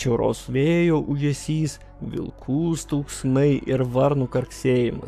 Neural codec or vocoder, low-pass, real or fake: codec, 44.1 kHz, 7.8 kbps, Pupu-Codec; 14.4 kHz; fake